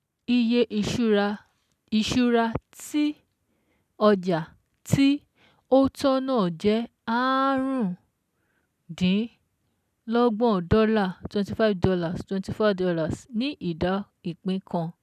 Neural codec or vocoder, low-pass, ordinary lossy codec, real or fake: none; 14.4 kHz; none; real